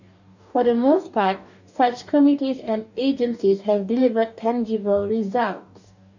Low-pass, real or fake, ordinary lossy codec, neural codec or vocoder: 7.2 kHz; fake; none; codec, 44.1 kHz, 2.6 kbps, DAC